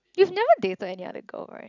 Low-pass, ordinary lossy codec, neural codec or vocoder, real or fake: 7.2 kHz; none; none; real